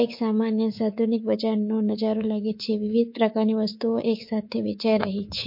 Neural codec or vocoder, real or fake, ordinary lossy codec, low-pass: codec, 24 kHz, 3.1 kbps, DualCodec; fake; MP3, 32 kbps; 5.4 kHz